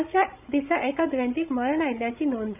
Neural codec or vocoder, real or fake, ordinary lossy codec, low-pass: codec, 16 kHz, 16 kbps, FreqCodec, larger model; fake; none; 3.6 kHz